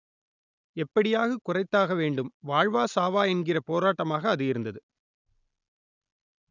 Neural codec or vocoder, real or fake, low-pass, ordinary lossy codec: none; real; 7.2 kHz; none